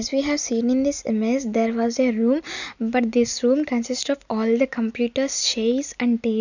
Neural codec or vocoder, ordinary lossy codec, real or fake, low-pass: none; none; real; 7.2 kHz